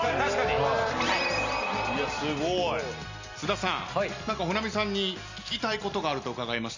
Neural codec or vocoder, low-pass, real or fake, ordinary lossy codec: none; 7.2 kHz; real; Opus, 64 kbps